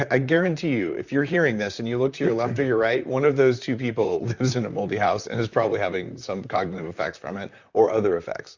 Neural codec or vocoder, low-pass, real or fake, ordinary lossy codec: vocoder, 44.1 kHz, 128 mel bands, Pupu-Vocoder; 7.2 kHz; fake; Opus, 64 kbps